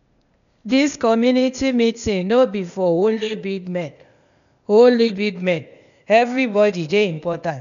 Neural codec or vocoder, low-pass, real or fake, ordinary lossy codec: codec, 16 kHz, 0.8 kbps, ZipCodec; 7.2 kHz; fake; none